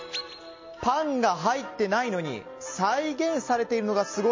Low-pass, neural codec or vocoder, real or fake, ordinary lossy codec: 7.2 kHz; none; real; MP3, 32 kbps